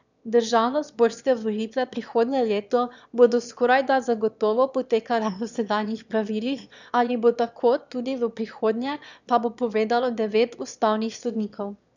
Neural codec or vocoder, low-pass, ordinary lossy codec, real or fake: autoencoder, 22.05 kHz, a latent of 192 numbers a frame, VITS, trained on one speaker; 7.2 kHz; none; fake